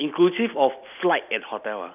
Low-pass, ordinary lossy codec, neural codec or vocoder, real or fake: 3.6 kHz; none; none; real